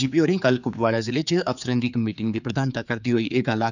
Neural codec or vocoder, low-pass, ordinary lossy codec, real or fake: codec, 16 kHz, 4 kbps, X-Codec, HuBERT features, trained on general audio; 7.2 kHz; none; fake